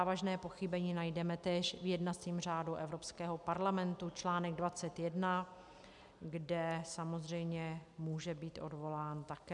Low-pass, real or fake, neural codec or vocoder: 10.8 kHz; real; none